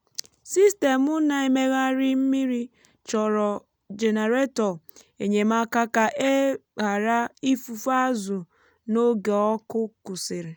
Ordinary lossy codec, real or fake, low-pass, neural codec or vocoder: none; real; none; none